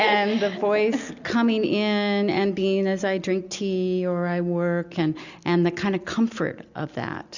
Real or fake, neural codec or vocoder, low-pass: real; none; 7.2 kHz